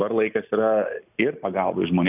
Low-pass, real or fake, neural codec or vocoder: 3.6 kHz; real; none